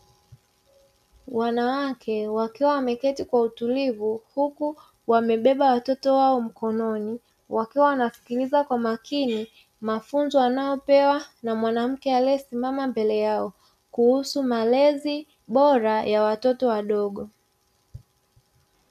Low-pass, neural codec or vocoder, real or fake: 14.4 kHz; none; real